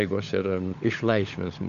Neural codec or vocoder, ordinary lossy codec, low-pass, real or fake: codec, 16 kHz, 16 kbps, FunCodec, trained on LibriTTS, 50 frames a second; AAC, 96 kbps; 7.2 kHz; fake